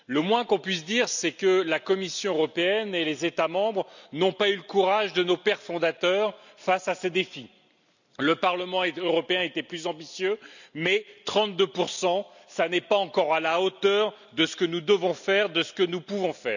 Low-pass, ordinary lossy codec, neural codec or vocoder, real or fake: 7.2 kHz; none; none; real